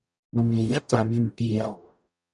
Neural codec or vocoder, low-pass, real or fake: codec, 44.1 kHz, 0.9 kbps, DAC; 10.8 kHz; fake